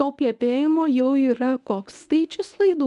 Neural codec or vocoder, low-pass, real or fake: codec, 24 kHz, 0.9 kbps, WavTokenizer, medium speech release version 1; 10.8 kHz; fake